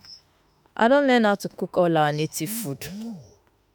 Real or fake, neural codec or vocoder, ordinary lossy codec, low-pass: fake; autoencoder, 48 kHz, 32 numbers a frame, DAC-VAE, trained on Japanese speech; none; none